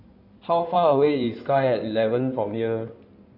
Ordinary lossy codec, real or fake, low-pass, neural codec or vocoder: AAC, 48 kbps; fake; 5.4 kHz; codec, 16 kHz in and 24 kHz out, 2.2 kbps, FireRedTTS-2 codec